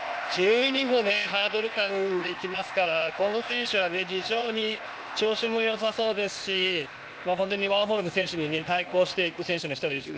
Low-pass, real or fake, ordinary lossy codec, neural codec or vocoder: none; fake; none; codec, 16 kHz, 0.8 kbps, ZipCodec